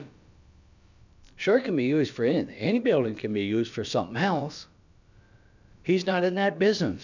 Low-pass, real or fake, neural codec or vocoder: 7.2 kHz; fake; codec, 16 kHz, about 1 kbps, DyCAST, with the encoder's durations